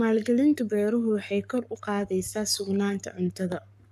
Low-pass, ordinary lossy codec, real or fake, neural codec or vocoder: 14.4 kHz; none; fake; codec, 44.1 kHz, 7.8 kbps, Pupu-Codec